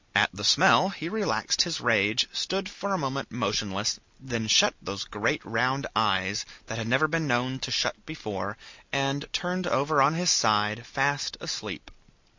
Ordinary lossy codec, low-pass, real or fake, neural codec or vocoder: MP3, 64 kbps; 7.2 kHz; real; none